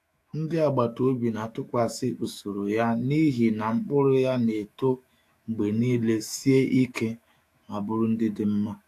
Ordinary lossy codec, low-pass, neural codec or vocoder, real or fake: AAC, 64 kbps; 14.4 kHz; autoencoder, 48 kHz, 128 numbers a frame, DAC-VAE, trained on Japanese speech; fake